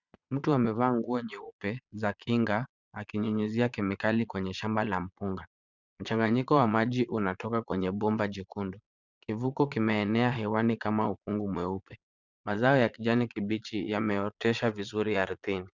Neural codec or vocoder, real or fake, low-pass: vocoder, 22.05 kHz, 80 mel bands, Vocos; fake; 7.2 kHz